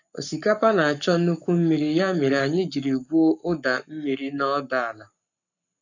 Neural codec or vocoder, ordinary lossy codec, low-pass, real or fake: codec, 44.1 kHz, 7.8 kbps, Pupu-Codec; none; 7.2 kHz; fake